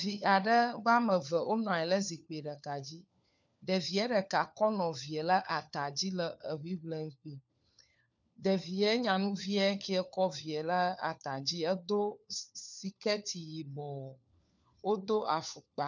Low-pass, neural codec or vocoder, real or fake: 7.2 kHz; codec, 16 kHz, 4 kbps, FunCodec, trained on LibriTTS, 50 frames a second; fake